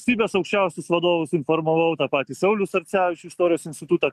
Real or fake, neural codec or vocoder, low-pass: real; none; 14.4 kHz